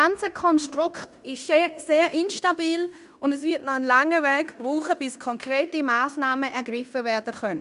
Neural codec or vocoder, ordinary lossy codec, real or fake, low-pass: codec, 16 kHz in and 24 kHz out, 0.9 kbps, LongCat-Audio-Codec, fine tuned four codebook decoder; none; fake; 10.8 kHz